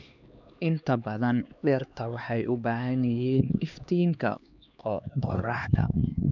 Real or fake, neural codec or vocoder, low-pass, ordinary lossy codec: fake; codec, 16 kHz, 2 kbps, X-Codec, HuBERT features, trained on LibriSpeech; 7.2 kHz; none